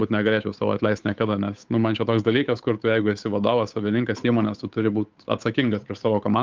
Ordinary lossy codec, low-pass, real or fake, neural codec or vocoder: Opus, 24 kbps; 7.2 kHz; fake; codec, 16 kHz, 8 kbps, FunCodec, trained on Chinese and English, 25 frames a second